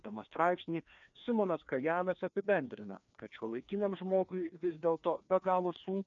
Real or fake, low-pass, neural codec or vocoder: fake; 7.2 kHz; codec, 16 kHz, 2 kbps, FreqCodec, larger model